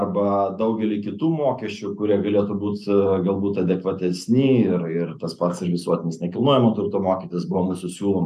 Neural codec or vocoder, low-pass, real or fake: none; 14.4 kHz; real